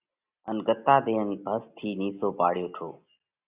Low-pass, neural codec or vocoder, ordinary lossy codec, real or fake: 3.6 kHz; none; Opus, 64 kbps; real